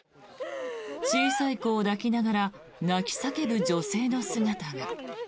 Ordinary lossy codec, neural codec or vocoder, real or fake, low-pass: none; none; real; none